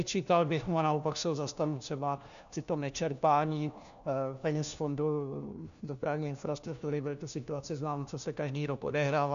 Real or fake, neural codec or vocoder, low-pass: fake; codec, 16 kHz, 1 kbps, FunCodec, trained on LibriTTS, 50 frames a second; 7.2 kHz